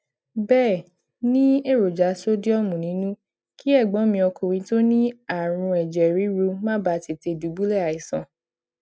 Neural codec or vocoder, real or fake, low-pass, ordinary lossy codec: none; real; none; none